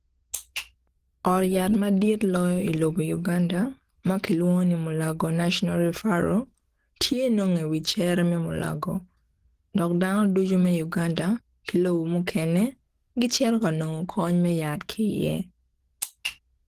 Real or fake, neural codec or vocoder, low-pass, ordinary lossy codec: fake; codec, 44.1 kHz, 7.8 kbps, DAC; 14.4 kHz; Opus, 16 kbps